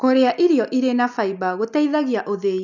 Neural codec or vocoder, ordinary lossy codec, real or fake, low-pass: none; none; real; 7.2 kHz